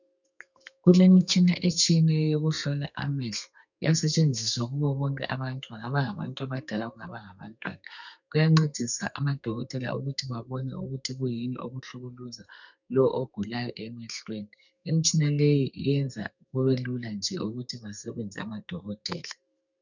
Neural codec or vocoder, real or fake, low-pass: codec, 32 kHz, 1.9 kbps, SNAC; fake; 7.2 kHz